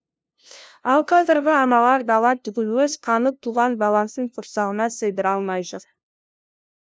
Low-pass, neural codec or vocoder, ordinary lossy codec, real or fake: none; codec, 16 kHz, 0.5 kbps, FunCodec, trained on LibriTTS, 25 frames a second; none; fake